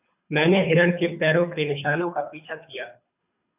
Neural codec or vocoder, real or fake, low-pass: codec, 24 kHz, 3 kbps, HILCodec; fake; 3.6 kHz